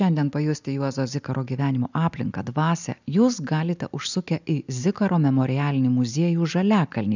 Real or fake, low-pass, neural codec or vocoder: real; 7.2 kHz; none